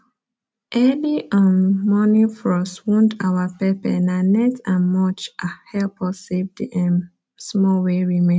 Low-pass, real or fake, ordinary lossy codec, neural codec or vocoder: none; real; none; none